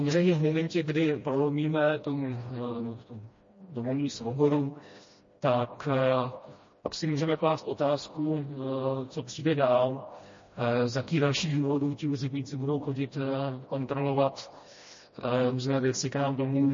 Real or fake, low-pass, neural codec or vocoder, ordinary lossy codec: fake; 7.2 kHz; codec, 16 kHz, 1 kbps, FreqCodec, smaller model; MP3, 32 kbps